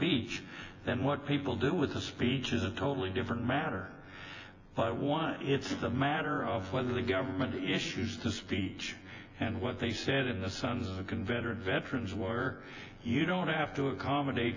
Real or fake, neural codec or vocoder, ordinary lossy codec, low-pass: fake; vocoder, 24 kHz, 100 mel bands, Vocos; AAC, 48 kbps; 7.2 kHz